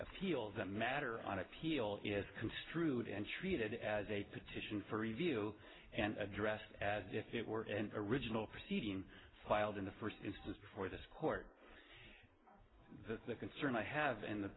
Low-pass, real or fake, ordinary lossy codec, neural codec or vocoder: 7.2 kHz; real; AAC, 16 kbps; none